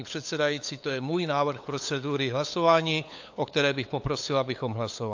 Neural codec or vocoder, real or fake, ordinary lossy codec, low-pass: codec, 16 kHz, 8 kbps, FunCodec, trained on LibriTTS, 25 frames a second; fake; AAC, 48 kbps; 7.2 kHz